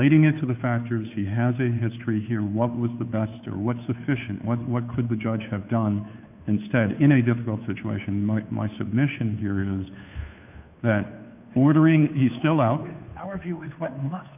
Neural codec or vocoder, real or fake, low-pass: codec, 16 kHz, 2 kbps, FunCodec, trained on Chinese and English, 25 frames a second; fake; 3.6 kHz